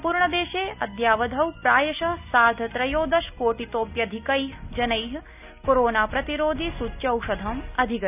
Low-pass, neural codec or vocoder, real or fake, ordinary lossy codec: 3.6 kHz; none; real; none